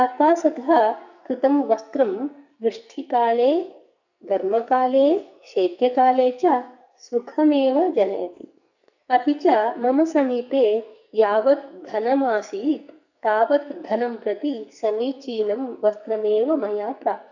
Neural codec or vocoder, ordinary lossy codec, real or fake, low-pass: codec, 44.1 kHz, 2.6 kbps, SNAC; none; fake; 7.2 kHz